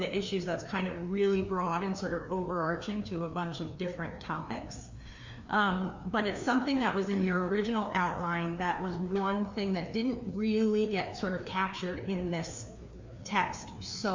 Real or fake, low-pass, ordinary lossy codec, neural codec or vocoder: fake; 7.2 kHz; MP3, 48 kbps; codec, 16 kHz, 2 kbps, FreqCodec, larger model